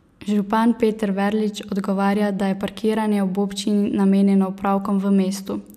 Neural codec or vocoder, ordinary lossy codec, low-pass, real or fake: none; none; 14.4 kHz; real